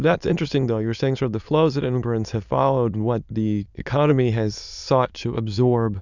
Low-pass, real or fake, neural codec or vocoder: 7.2 kHz; fake; autoencoder, 22.05 kHz, a latent of 192 numbers a frame, VITS, trained on many speakers